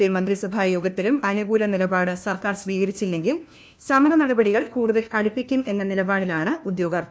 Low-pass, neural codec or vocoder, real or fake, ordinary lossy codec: none; codec, 16 kHz, 1 kbps, FunCodec, trained on LibriTTS, 50 frames a second; fake; none